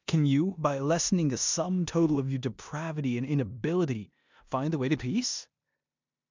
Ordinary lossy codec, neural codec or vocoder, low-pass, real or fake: MP3, 64 kbps; codec, 16 kHz in and 24 kHz out, 0.9 kbps, LongCat-Audio-Codec, four codebook decoder; 7.2 kHz; fake